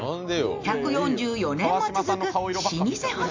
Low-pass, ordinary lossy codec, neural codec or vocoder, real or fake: 7.2 kHz; MP3, 64 kbps; none; real